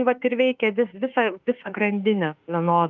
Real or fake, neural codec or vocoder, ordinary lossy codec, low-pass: fake; autoencoder, 48 kHz, 32 numbers a frame, DAC-VAE, trained on Japanese speech; Opus, 24 kbps; 7.2 kHz